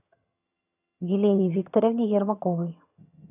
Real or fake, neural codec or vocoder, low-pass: fake; vocoder, 22.05 kHz, 80 mel bands, HiFi-GAN; 3.6 kHz